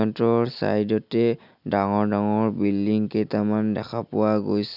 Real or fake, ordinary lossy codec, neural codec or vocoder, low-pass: real; none; none; 5.4 kHz